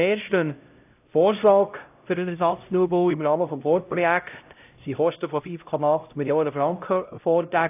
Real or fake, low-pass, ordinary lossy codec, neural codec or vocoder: fake; 3.6 kHz; AAC, 32 kbps; codec, 16 kHz, 0.5 kbps, X-Codec, HuBERT features, trained on LibriSpeech